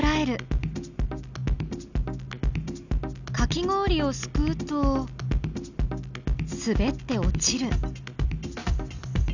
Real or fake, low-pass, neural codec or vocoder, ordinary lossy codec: real; 7.2 kHz; none; none